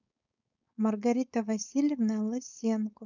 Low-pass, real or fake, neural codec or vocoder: 7.2 kHz; fake; codec, 16 kHz, 4.8 kbps, FACodec